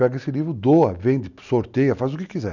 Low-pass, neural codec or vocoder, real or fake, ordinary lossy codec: 7.2 kHz; none; real; none